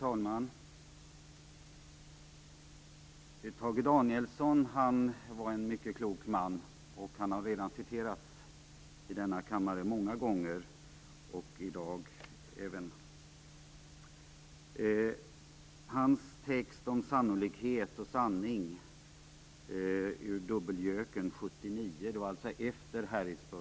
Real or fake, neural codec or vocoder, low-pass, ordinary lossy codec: real; none; none; none